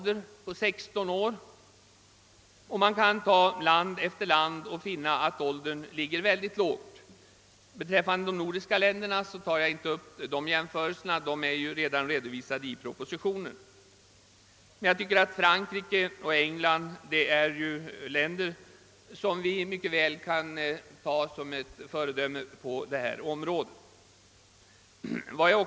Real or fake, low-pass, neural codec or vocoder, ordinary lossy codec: real; none; none; none